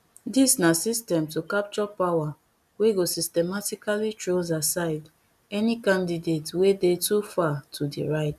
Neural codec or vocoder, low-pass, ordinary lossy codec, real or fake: none; 14.4 kHz; none; real